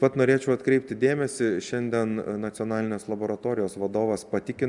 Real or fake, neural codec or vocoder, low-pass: real; none; 10.8 kHz